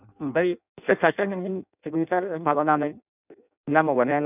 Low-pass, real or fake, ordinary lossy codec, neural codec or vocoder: 3.6 kHz; fake; none; codec, 16 kHz in and 24 kHz out, 0.6 kbps, FireRedTTS-2 codec